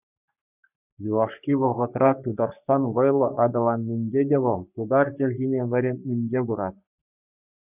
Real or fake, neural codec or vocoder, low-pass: fake; codec, 44.1 kHz, 3.4 kbps, Pupu-Codec; 3.6 kHz